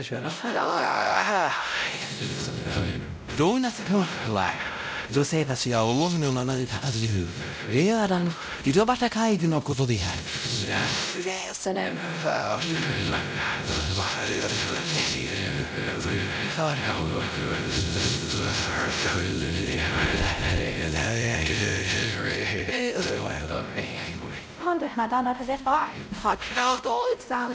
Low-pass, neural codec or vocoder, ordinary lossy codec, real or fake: none; codec, 16 kHz, 0.5 kbps, X-Codec, WavLM features, trained on Multilingual LibriSpeech; none; fake